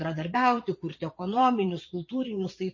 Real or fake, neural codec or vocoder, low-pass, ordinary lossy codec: fake; vocoder, 22.05 kHz, 80 mel bands, Vocos; 7.2 kHz; MP3, 32 kbps